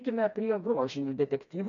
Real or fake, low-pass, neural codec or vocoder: fake; 7.2 kHz; codec, 16 kHz, 2 kbps, FreqCodec, smaller model